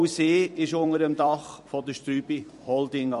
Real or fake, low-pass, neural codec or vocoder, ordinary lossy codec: fake; 14.4 kHz; vocoder, 44.1 kHz, 128 mel bands every 256 samples, BigVGAN v2; MP3, 48 kbps